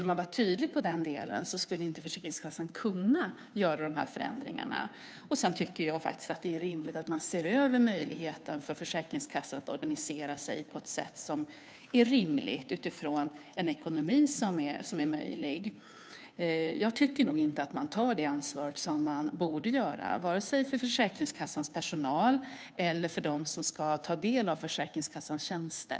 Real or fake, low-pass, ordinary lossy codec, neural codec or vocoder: fake; none; none; codec, 16 kHz, 2 kbps, FunCodec, trained on Chinese and English, 25 frames a second